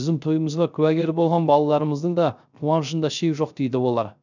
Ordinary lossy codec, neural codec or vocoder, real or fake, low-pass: none; codec, 16 kHz, 0.3 kbps, FocalCodec; fake; 7.2 kHz